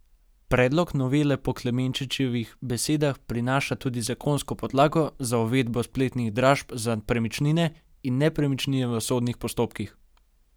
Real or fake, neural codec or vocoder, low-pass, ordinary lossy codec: real; none; none; none